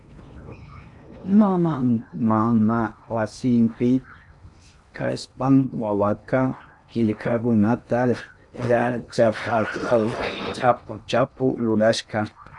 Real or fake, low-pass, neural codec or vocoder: fake; 10.8 kHz; codec, 16 kHz in and 24 kHz out, 0.8 kbps, FocalCodec, streaming, 65536 codes